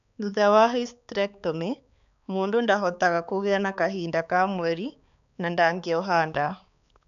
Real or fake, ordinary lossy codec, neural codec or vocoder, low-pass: fake; none; codec, 16 kHz, 4 kbps, X-Codec, HuBERT features, trained on balanced general audio; 7.2 kHz